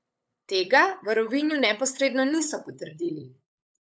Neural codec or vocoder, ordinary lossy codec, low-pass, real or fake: codec, 16 kHz, 8 kbps, FunCodec, trained on LibriTTS, 25 frames a second; none; none; fake